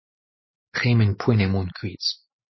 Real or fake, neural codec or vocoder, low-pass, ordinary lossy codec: real; none; 7.2 kHz; MP3, 24 kbps